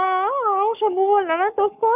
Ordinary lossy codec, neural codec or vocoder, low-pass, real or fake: none; codec, 44.1 kHz, 7.8 kbps, Pupu-Codec; 3.6 kHz; fake